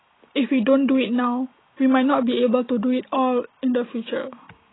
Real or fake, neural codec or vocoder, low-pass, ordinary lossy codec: real; none; 7.2 kHz; AAC, 16 kbps